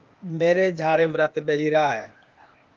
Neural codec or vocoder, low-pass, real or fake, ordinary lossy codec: codec, 16 kHz, 0.8 kbps, ZipCodec; 7.2 kHz; fake; Opus, 32 kbps